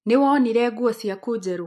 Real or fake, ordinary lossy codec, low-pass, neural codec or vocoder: real; MP3, 64 kbps; 14.4 kHz; none